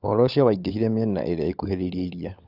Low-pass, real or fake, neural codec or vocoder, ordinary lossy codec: 5.4 kHz; fake; codec, 16 kHz, 16 kbps, FunCodec, trained on LibriTTS, 50 frames a second; none